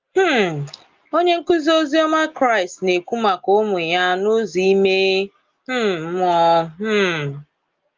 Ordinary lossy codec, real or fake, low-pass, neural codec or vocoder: Opus, 32 kbps; real; 7.2 kHz; none